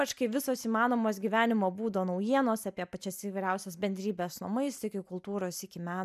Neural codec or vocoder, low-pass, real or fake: none; 14.4 kHz; real